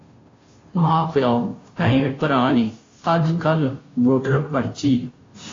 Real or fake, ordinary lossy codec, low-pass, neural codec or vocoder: fake; AAC, 32 kbps; 7.2 kHz; codec, 16 kHz, 0.5 kbps, FunCodec, trained on Chinese and English, 25 frames a second